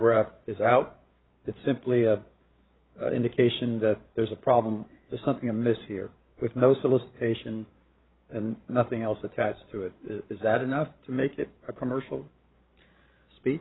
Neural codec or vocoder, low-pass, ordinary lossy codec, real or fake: codec, 16 kHz, 8 kbps, FreqCodec, larger model; 7.2 kHz; AAC, 16 kbps; fake